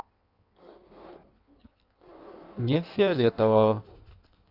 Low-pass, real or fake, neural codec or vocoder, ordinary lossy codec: 5.4 kHz; fake; codec, 16 kHz in and 24 kHz out, 1.1 kbps, FireRedTTS-2 codec; none